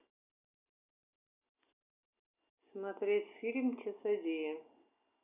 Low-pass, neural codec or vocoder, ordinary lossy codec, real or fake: 3.6 kHz; none; none; real